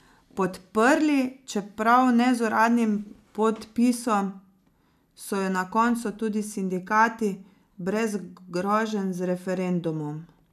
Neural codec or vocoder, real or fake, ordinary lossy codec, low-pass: none; real; none; 14.4 kHz